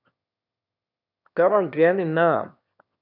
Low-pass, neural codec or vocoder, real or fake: 5.4 kHz; autoencoder, 22.05 kHz, a latent of 192 numbers a frame, VITS, trained on one speaker; fake